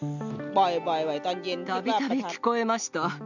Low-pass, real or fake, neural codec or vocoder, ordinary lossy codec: 7.2 kHz; real; none; none